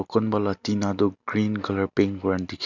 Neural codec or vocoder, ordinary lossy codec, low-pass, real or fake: none; none; 7.2 kHz; real